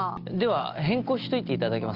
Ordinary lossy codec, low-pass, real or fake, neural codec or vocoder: Opus, 24 kbps; 5.4 kHz; real; none